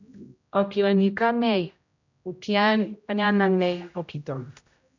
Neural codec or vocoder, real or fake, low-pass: codec, 16 kHz, 0.5 kbps, X-Codec, HuBERT features, trained on general audio; fake; 7.2 kHz